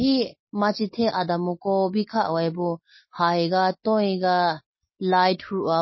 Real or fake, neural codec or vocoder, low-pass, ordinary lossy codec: real; none; 7.2 kHz; MP3, 24 kbps